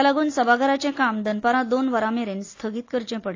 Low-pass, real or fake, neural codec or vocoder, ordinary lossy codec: 7.2 kHz; real; none; AAC, 32 kbps